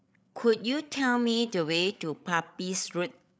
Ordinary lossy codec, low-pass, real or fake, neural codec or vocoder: none; none; fake; codec, 16 kHz, 8 kbps, FreqCodec, larger model